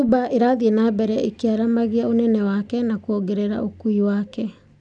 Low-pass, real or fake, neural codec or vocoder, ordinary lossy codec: 9.9 kHz; real; none; none